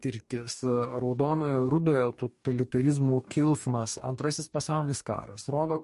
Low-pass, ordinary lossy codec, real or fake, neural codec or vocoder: 14.4 kHz; MP3, 48 kbps; fake; codec, 44.1 kHz, 2.6 kbps, DAC